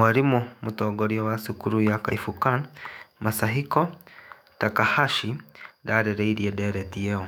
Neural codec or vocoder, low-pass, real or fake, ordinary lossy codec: autoencoder, 48 kHz, 128 numbers a frame, DAC-VAE, trained on Japanese speech; 19.8 kHz; fake; none